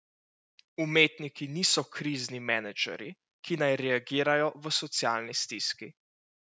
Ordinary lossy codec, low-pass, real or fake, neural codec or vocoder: none; none; real; none